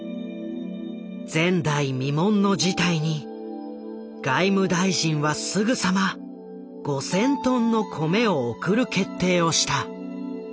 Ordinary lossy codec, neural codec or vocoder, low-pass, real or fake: none; none; none; real